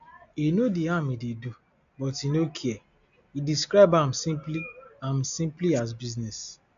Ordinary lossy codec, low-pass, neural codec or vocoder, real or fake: AAC, 96 kbps; 7.2 kHz; none; real